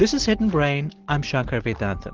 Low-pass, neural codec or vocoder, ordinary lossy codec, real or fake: 7.2 kHz; none; Opus, 16 kbps; real